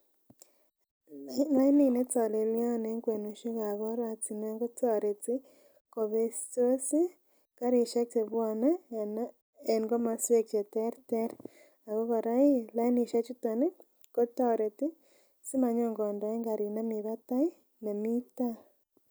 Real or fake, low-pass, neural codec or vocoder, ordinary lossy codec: real; none; none; none